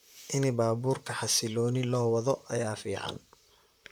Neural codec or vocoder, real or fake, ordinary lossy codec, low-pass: vocoder, 44.1 kHz, 128 mel bands, Pupu-Vocoder; fake; none; none